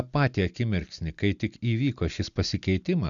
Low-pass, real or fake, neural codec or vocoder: 7.2 kHz; real; none